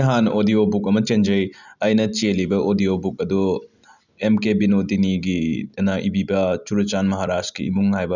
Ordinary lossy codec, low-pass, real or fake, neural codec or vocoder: none; 7.2 kHz; real; none